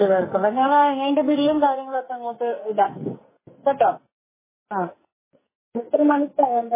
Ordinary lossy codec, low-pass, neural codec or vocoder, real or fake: MP3, 16 kbps; 3.6 kHz; codec, 44.1 kHz, 2.6 kbps, SNAC; fake